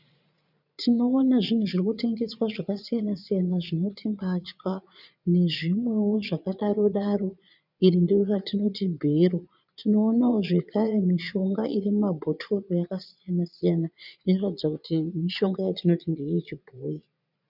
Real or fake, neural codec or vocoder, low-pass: fake; vocoder, 22.05 kHz, 80 mel bands, Vocos; 5.4 kHz